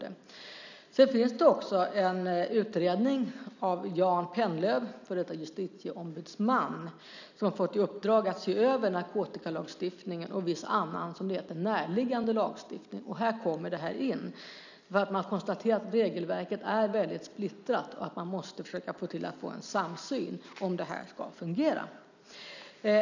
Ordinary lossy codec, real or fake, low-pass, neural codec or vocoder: none; real; 7.2 kHz; none